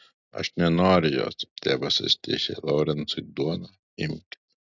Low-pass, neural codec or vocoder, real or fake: 7.2 kHz; none; real